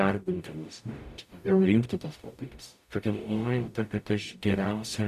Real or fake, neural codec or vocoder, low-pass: fake; codec, 44.1 kHz, 0.9 kbps, DAC; 14.4 kHz